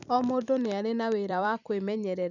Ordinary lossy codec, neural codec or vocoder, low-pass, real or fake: none; vocoder, 44.1 kHz, 128 mel bands every 256 samples, BigVGAN v2; 7.2 kHz; fake